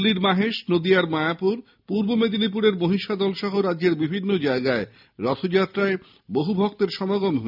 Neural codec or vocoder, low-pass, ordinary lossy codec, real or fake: none; 5.4 kHz; none; real